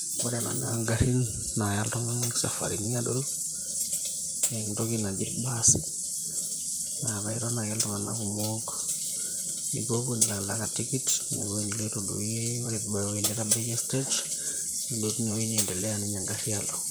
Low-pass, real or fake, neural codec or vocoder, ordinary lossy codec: none; fake; vocoder, 44.1 kHz, 128 mel bands, Pupu-Vocoder; none